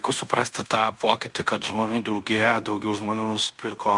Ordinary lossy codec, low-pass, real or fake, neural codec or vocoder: MP3, 96 kbps; 10.8 kHz; fake; codec, 16 kHz in and 24 kHz out, 0.9 kbps, LongCat-Audio-Codec, fine tuned four codebook decoder